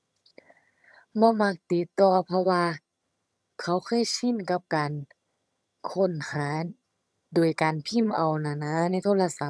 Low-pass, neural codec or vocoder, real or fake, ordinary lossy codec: none; vocoder, 22.05 kHz, 80 mel bands, HiFi-GAN; fake; none